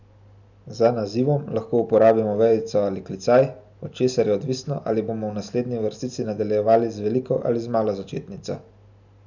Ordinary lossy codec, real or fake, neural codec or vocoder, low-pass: none; real; none; 7.2 kHz